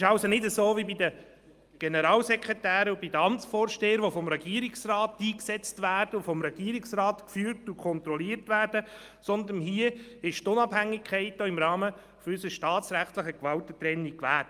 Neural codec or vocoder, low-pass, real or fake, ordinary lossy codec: none; 14.4 kHz; real; Opus, 32 kbps